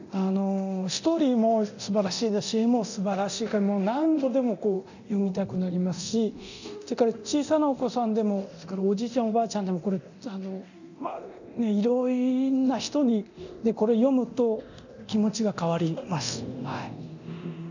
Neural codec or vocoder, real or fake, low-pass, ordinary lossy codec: codec, 24 kHz, 0.9 kbps, DualCodec; fake; 7.2 kHz; none